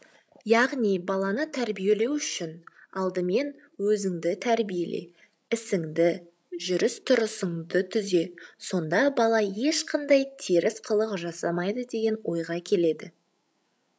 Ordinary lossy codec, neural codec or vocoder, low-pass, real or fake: none; codec, 16 kHz, 16 kbps, FreqCodec, larger model; none; fake